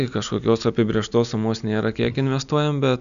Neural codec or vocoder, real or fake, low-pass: none; real; 7.2 kHz